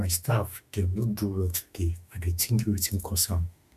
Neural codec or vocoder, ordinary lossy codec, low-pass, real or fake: autoencoder, 48 kHz, 32 numbers a frame, DAC-VAE, trained on Japanese speech; none; 14.4 kHz; fake